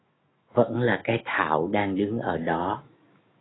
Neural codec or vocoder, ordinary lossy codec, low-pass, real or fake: autoencoder, 48 kHz, 128 numbers a frame, DAC-VAE, trained on Japanese speech; AAC, 16 kbps; 7.2 kHz; fake